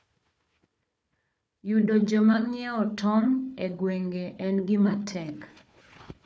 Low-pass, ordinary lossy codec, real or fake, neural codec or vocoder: none; none; fake; codec, 16 kHz, 4 kbps, FunCodec, trained on Chinese and English, 50 frames a second